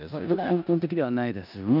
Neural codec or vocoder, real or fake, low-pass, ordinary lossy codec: codec, 16 kHz in and 24 kHz out, 0.9 kbps, LongCat-Audio-Codec, four codebook decoder; fake; 5.4 kHz; none